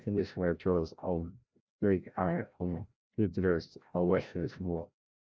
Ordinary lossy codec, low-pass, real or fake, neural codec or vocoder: none; none; fake; codec, 16 kHz, 0.5 kbps, FreqCodec, larger model